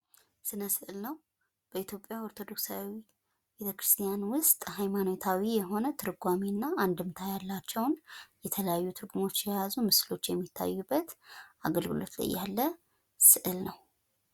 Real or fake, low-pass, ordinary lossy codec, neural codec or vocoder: real; 19.8 kHz; Opus, 64 kbps; none